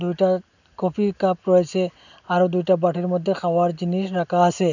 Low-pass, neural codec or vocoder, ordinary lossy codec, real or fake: 7.2 kHz; none; none; real